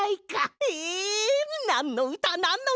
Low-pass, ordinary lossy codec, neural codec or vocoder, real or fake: none; none; none; real